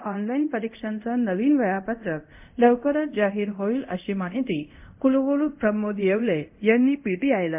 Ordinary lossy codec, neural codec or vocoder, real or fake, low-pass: MP3, 32 kbps; codec, 24 kHz, 0.5 kbps, DualCodec; fake; 3.6 kHz